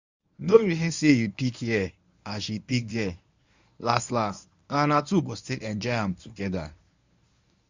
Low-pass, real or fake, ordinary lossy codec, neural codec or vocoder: 7.2 kHz; fake; Opus, 64 kbps; codec, 24 kHz, 0.9 kbps, WavTokenizer, medium speech release version 1